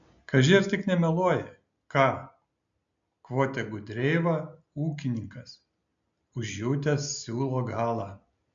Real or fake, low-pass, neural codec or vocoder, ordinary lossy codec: real; 7.2 kHz; none; AAC, 64 kbps